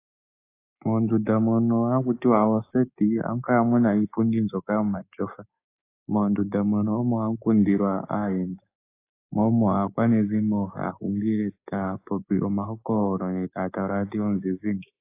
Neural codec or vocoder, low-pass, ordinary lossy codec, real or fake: codec, 16 kHz, 6 kbps, DAC; 3.6 kHz; AAC, 24 kbps; fake